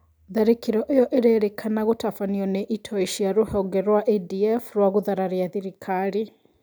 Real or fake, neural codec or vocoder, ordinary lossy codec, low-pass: real; none; none; none